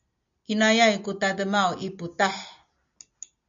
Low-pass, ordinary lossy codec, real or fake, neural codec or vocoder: 7.2 kHz; MP3, 64 kbps; real; none